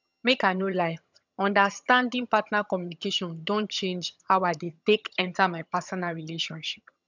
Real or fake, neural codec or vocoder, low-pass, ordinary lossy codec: fake; vocoder, 22.05 kHz, 80 mel bands, HiFi-GAN; 7.2 kHz; none